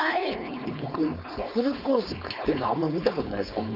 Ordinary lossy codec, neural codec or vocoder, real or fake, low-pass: none; codec, 16 kHz, 4.8 kbps, FACodec; fake; 5.4 kHz